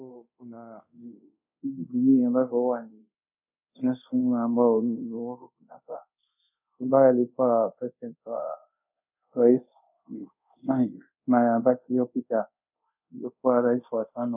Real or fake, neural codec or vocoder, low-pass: fake; codec, 24 kHz, 0.5 kbps, DualCodec; 3.6 kHz